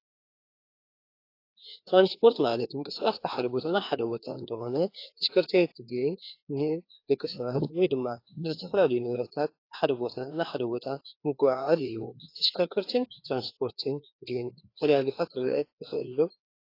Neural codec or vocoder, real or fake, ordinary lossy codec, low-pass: codec, 16 kHz, 2 kbps, FreqCodec, larger model; fake; AAC, 32 kbps; 5.4 kHz